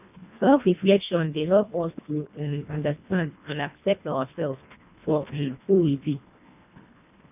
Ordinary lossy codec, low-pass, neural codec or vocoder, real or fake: none; 3.6 kHz; codec, 24 kHz, 1.5 kbps, HILCodec; fake